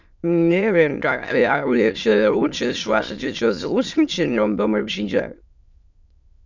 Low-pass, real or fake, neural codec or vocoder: 7.2 kHz; fake; autoencoder, 22.05 kHz, a latent of 192 numbers a frame, VITS, trained on many speakers